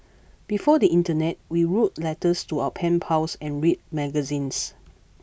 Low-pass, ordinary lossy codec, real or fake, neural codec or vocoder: none; none; real; none